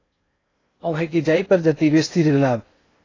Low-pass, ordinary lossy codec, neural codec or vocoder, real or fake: 7.2 kHz; AAC, 32 kbps; codec, 16 kHz in and 24 kHz out, 0.6 kbps, FocalCodec, streaming, 2048 codes; fake